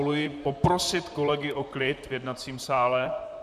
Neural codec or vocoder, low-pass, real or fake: vocoder, 44.1 kHz, 128 mel bands, Pupu-Vocoder; 14.4 kHz; fake